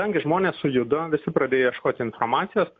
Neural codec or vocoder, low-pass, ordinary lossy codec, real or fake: none; 7.2 kHz; AAC, 48 kbps; real